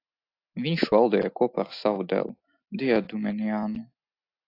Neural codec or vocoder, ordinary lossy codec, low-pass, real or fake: none; AAC, 32 kbps; 5.4 kHz; real